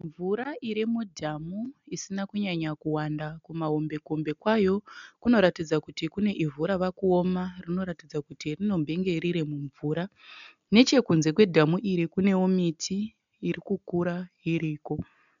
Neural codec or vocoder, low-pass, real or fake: none; 7.2 kHz; real